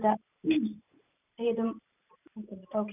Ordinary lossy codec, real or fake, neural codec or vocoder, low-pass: none; fake; vocoder, 44.1 kHz, 128 mel bands every 512 samples, BigVGAN v2; 3.6 kHz